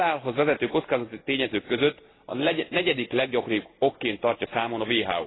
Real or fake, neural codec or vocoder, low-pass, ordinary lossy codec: real; none; 7.2 kHz; AAC, 16 kbps